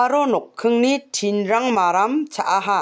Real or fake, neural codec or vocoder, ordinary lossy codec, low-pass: real; none; none; none